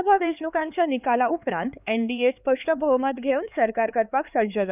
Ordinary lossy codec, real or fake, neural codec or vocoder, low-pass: none; fake; codec, 16 kHz, 4 kbps, X-Codec, HuBERT features, trained on LibriSpeech; 3.6 kHz